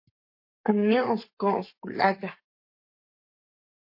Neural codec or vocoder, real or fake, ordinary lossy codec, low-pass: codec, 44.1 kHz, 7.8 kbps, Pupu-Codec; fake; MP3, 32 kbps; 5.4 kHz